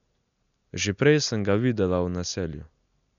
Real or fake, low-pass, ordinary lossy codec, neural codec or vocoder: real; 7.2 kHz; MP3, 96 kbps; none